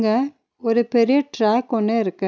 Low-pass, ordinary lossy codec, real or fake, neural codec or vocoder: none; none; real; none